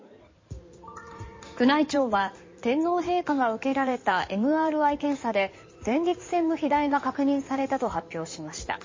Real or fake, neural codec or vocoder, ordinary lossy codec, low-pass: fake; codec, 16 kHz in and 24 kHz out, 2.2 kbps, FireRedTTS-2 codec; MP3, 32 kbps; 7.2 kHz